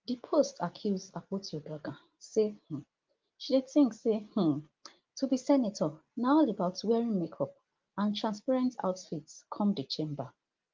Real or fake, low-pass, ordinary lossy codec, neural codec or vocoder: real; 7.2 kHz; Opus, 32 kbps; none